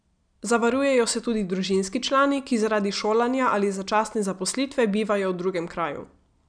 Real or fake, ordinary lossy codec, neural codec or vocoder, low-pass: real; none; none; 9.9 kHz